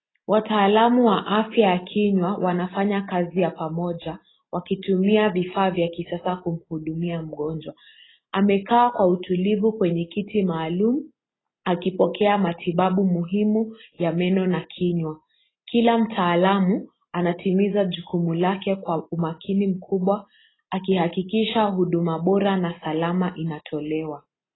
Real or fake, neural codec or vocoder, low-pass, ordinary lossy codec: real; none; 7.2 kHz; AAC, 16 kbps